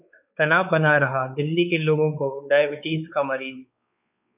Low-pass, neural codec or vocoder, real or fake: 3.6 kHz; codec, 16 kHz, 4 kbps, X-Codec, WavLM features, trained on Multilingual LibriSpeech; fake